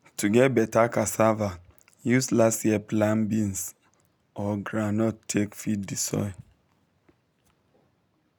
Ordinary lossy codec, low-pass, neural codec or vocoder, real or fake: none; none; none; real